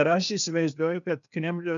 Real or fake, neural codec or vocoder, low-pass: fake; codec, 16 kHz, 0.8 kbps, ZipCodec; 7.2 kHz